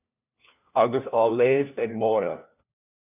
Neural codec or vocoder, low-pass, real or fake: codec, 16 kHz, 1 kbps, FunCodec, trained on LibriTTS, 50 frames a second; 3.6 kHz; fake